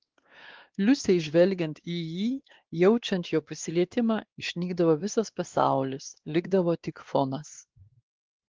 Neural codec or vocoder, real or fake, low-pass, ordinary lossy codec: codec, 16 kHz, 2 kbps, X-Codec, WavLM features, trained on Multilingual LibriSpeech; fake; 7.2 kHz; Opus, 16 kbps